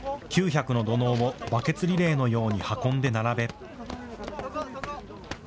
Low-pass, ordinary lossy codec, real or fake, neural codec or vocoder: none; none; real; none